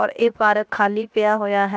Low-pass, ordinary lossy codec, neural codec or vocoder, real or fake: none; none; codec, 16 kHz, 0.7 kbps, FocalCodec; fake